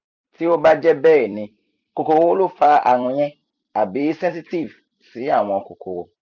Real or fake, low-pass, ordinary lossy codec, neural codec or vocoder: fake; 7.2 kHz; none; vocoder, 44.1 kHz, 128 mel bands every 256 samples, BigVGAN v2